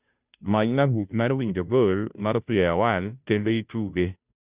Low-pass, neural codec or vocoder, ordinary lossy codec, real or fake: 3.6 kHz; codec, 16 kHz, 0.5 kbps, FunCodec, trained on Chinese and English, 25 frames a second; Opus, 64 kbps; fake